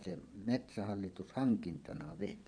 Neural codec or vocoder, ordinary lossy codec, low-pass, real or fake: vocoder, 22.05 kHz, 80 mel bands, Vocos; none; 9.9 kHz; fake